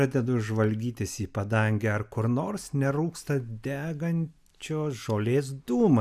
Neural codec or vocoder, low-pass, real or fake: none; 14.4 kHz; real